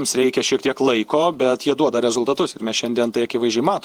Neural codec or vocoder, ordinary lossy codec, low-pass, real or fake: vocoder, 48 kHz, 128 mel bands, Vocos; Opus, 24 kbps; 19.8 kHz; fake